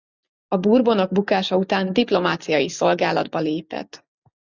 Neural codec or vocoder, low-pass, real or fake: none; 7.2 kHz; real